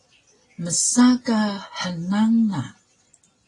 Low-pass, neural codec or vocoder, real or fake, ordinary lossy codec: 10.8 kHz; none; real; AAC, 32 kbps